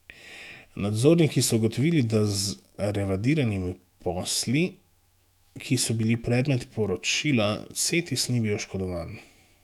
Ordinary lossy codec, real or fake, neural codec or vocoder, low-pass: none; fake; autoencoder, 48 kHz, 128 numbers a frame, DAC-VAE, trained on Japanese speech; 19.8 kHz